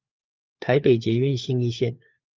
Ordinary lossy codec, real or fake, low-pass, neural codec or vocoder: Opus, 24 kbps; fake; 7.2 kHz; codec, 16 kHz, 4 kbps, FunCodec, trained on LibriTTS, 50 frames a second